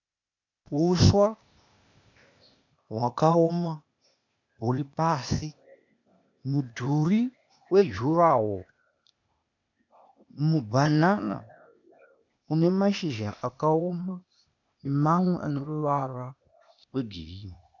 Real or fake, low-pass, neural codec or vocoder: fake; 7.2 kHz; codec, 16 kHz, 0.8 kbps, ZipCodec